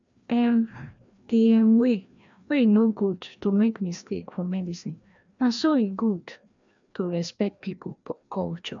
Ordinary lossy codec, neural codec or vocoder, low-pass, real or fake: MP3, 64 kbps; codec, 16 kHz, 1 kbps, FreqCodec, larger model; 7.2 kHz; fake